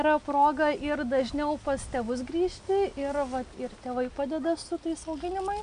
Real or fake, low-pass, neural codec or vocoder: real; 9.9 kHz; none